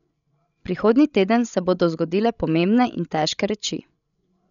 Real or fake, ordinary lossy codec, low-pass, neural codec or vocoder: fake; none; 7.2 kHz; codec, 16 kHz, 16 kbps, FreqCodec, larger model